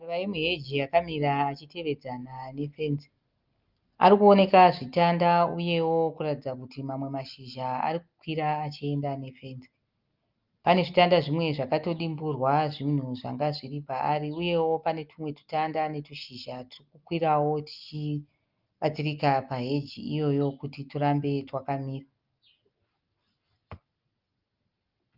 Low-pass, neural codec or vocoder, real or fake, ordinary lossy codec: 5.4 kHz; none; real; Opus, 24 kbps